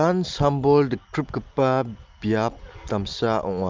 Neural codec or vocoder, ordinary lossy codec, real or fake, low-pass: none; Opus, 24 kbps; real; 7.2 kHz